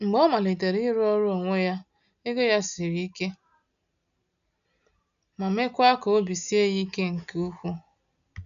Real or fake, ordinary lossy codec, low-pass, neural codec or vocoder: real; none; 7.2 kHz; none